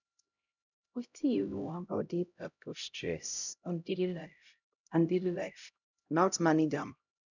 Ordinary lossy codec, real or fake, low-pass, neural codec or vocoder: none; fake; 7.2 kHz; codec, 16 kHz, 0.5 kbps, X-Codec, HuBERT features, trained on LibriSpeech